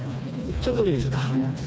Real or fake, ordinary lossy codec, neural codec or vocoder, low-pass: fake; none; codec, 16 kHz, 2 kbps, FreqCodec, smaller model; none